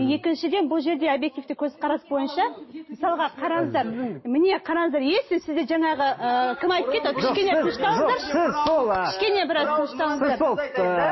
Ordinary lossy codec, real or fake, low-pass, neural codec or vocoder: MP3, 24 kbps; real; 7.2 kHz; none